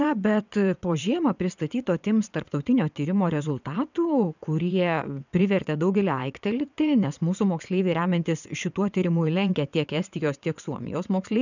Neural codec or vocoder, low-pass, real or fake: vocoder, 22.05 kHz, 80 mel bands, WaveNeXt; 7.2 kHz; fake